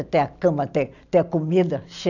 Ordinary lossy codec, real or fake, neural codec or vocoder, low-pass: none; real; none; 7.2 kHz